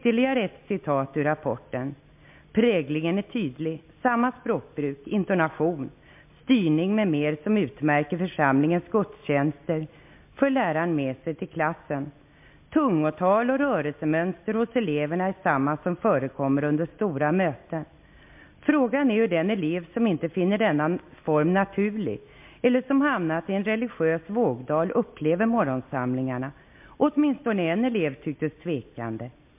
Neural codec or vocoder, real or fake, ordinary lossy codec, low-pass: none; real; MP3, 32 kbps; 3.6 kHz